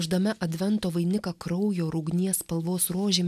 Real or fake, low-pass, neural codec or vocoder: real; 14.4 kHz; none